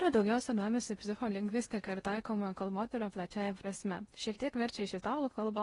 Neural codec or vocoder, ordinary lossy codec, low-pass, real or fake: codec, 16 kHz in and 24 kHz out, 0.8 kbps, FocalCodec, streaming, 65536 codes; AAC, 32 kbps; 10.8 kHz; fake